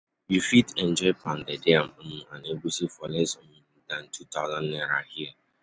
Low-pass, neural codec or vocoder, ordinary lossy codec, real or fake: none; none; none; real